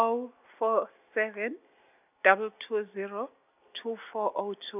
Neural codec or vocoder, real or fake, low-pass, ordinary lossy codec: none; real; 3.6 kHz; none